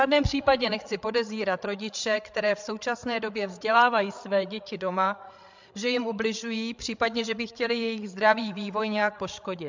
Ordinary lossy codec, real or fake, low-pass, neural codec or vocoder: MP3, 64 kbps; fake; 7.2 kHz; codec, 16 kHz, 8 kbps, FreqCodec, larger model